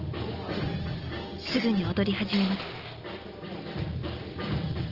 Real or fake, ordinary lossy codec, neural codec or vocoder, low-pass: real; Opus, 16 kbps; none; 5.4 kHz